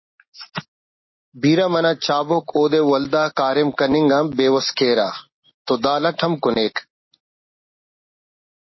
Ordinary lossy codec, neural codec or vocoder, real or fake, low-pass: MP3, 24 kbps; none; real; 7.2 kHz